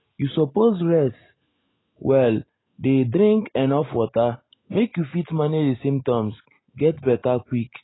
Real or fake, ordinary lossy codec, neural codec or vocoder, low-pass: real; AAC, 16 kbps; none; 7.2 kHz